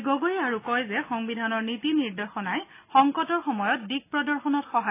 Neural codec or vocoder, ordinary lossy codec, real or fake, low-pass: none; AAC, 24 kbps; real; 3.6 kHz